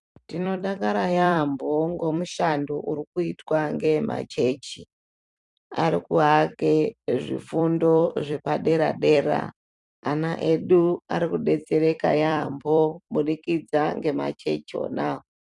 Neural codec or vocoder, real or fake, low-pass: vocoder, 44.1 kHz, 128 mel bands every 256 samples, BigVGAN v2; fake; 10.8 kHz